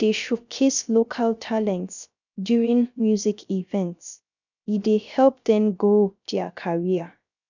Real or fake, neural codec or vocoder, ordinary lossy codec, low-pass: fake; codec, 16 kHz, 0.3 kbps, FocalCodec; none; 7.2 kHz